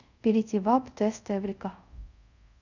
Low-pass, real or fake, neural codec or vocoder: 7.2 kHz; fake; codec, 24 kHz, 0.5 kbps, DualCodec